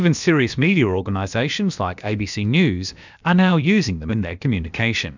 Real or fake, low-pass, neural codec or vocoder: fake; 7.2 kHz; codec, 16 kHz, about 1 kbps, DyCAST, with the encoder's durations